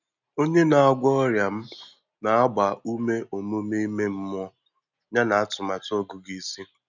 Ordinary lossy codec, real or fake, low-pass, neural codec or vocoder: none; real; 7.2 kHz; none